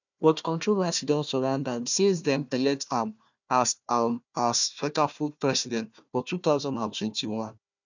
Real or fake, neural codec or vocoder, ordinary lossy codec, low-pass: fake; codec, 16 kHz, 1 kbps, FunCodec, trained on Chinese and English, 50 frames a second; none; 7.2 kHz